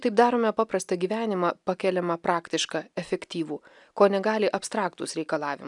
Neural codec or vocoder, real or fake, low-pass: none; real; 10.8 kHz